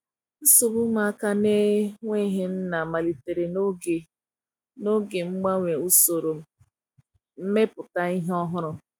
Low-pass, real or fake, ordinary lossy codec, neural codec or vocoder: 19.8 kHz; real; none; none